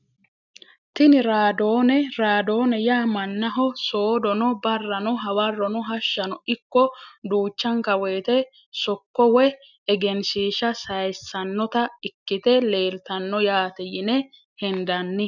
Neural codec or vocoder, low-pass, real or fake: none; 7.2 kHz; real